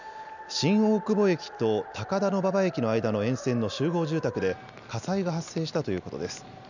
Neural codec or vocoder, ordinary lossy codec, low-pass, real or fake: none; none; 7.2 kHz; real